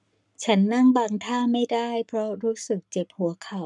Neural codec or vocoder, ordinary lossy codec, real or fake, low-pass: codec, 44.1 kHz, 7.8 kbps, Pupu-Codec; none; fake; 10.8 kHz